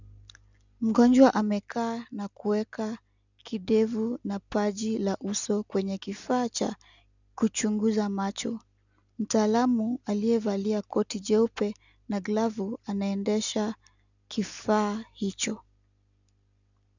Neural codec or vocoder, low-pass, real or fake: none; 7.2 kHz; real